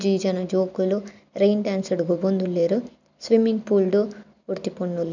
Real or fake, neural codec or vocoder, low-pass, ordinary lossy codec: real; none; 7.2 kHz; none